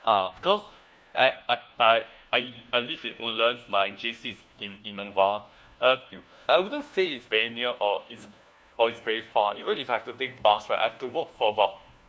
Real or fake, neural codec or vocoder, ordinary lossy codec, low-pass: fake; codec, 16 kHz, 1 kbps, FunCodec, trained on LibriTTS, 50 frames a second; none; none